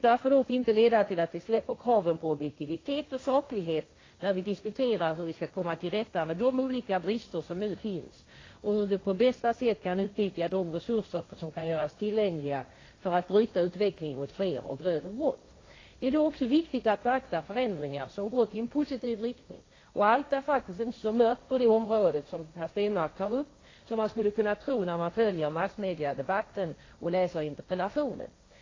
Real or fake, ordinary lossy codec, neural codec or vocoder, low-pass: fake; AAC, 32 kbps; codec, 16 kHz, 1.1 kbps, Voila-Tokenizer; 7.2 kHz